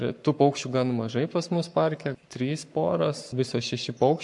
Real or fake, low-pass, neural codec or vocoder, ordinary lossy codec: fake; 10.8 kHz; codec, 44.1 kHz, 7.8 kbps, Pupu-Codec; MP3, 64 kbps